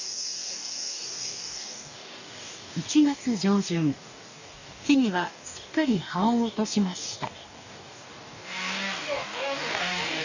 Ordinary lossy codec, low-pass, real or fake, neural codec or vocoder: none; 7.2 kHz; fake; codec, 44.1 kHz, 2.6 kbps, DAC